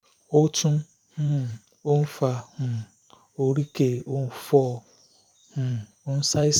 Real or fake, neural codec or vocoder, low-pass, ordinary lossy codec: fake; vocoder, 44.1 kHz, 128 mel bands every 512 samples, BigVGAN v2; 19.8 kHz; none